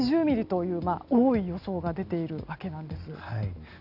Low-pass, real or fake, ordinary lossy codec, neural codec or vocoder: 5.4 kHz; real; none; none